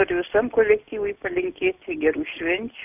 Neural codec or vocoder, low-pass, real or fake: none; 3.6 kHz; real